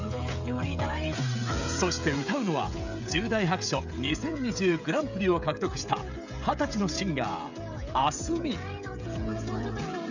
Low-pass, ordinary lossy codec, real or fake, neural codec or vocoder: 7.2 kHz; none; fake; codec, 16 kHz, 16 kbps, FreqCodec, smaller model